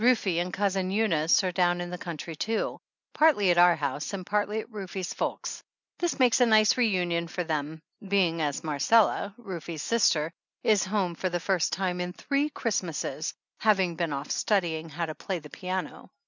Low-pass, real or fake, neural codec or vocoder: 7.2 kHz; real; none